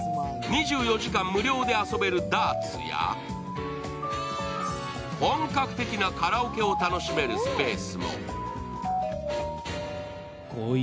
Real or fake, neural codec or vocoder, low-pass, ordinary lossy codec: real; none; none; none